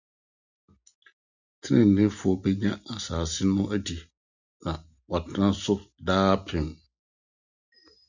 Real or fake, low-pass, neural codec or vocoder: real; 7.2 kHz; none